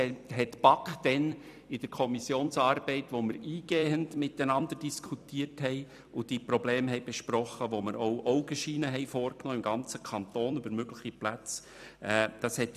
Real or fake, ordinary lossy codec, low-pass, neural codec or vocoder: fake; none; 14.4 kHz; vocoder, 44.1 kHz, 128 mel bands every 256 samples, BigVGAN v2